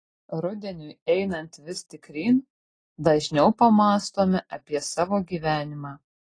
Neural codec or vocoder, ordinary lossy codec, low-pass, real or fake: none; AAC, 32 kbps; 9.9 kHz; real